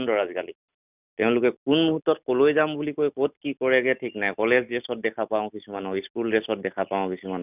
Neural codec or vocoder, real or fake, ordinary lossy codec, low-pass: none; real; none; 3.6 kHz